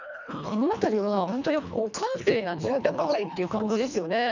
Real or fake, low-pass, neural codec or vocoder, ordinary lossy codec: fake; 7.2 kHz; codec, 24 kHz, 1.5 kbps, HILCodec; none